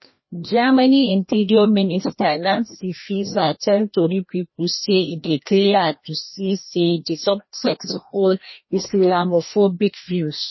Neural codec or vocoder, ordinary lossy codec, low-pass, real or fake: codec, 16 kHz, 1 kbps, FreqCodec, larger model; MP3, 24 kbps; 7.2 kHz; fake